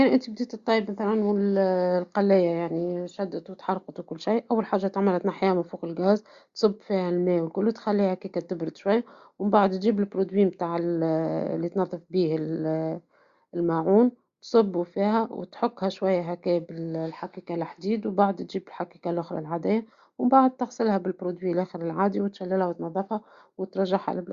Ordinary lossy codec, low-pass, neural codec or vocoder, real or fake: Opus, 64 kbps; 7.2 kHz; none; real